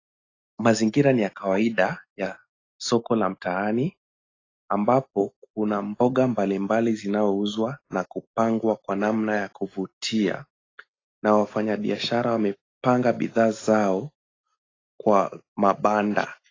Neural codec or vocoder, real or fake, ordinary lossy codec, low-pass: none; real; AAC, 32 kbps; 7.2 kHz